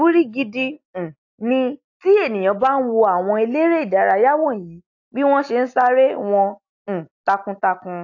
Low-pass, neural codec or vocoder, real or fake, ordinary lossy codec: 7.2 kHz; none; real; AAC, 48 kbps